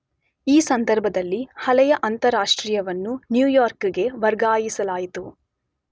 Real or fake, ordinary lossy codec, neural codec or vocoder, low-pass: real; none; none; none